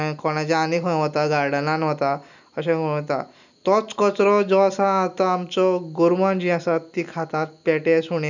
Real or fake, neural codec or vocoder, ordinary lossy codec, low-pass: real; none; none; 7.2 kHz